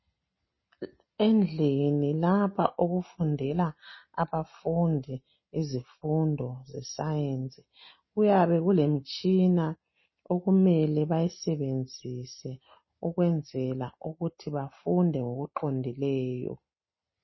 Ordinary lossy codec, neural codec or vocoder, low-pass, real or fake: MP3, 24 kbps; none; 7.2 kHz; real